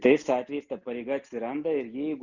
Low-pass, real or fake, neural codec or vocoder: 7.2 kHz; real; none